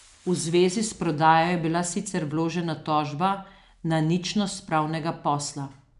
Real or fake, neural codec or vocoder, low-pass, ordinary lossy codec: real; none; 10.8 kHz; none